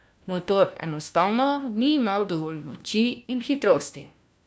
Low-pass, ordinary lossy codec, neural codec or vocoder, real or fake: none; none; codec, 16 kHz, 1 kbps, FunCodec, trained on LibriTTS, 50 frames a second; fake